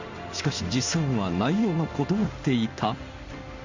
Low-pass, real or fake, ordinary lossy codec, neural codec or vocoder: 7.2 kHz; fake; MP3, 64 kbps; codec, 16 kHz in and 24 kHz out, 1 kbps, XY-Tokenizer